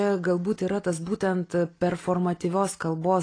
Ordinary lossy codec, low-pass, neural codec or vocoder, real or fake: AAC, 32 kbps; 9.9 kHz; none; real